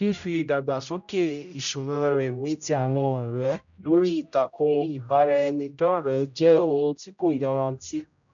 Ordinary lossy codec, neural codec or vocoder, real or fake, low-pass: none; codec, 16 kHz, 0.5 kbps, X-Codec, HuBERT features, trained on general audio; fake; 7.2 kHz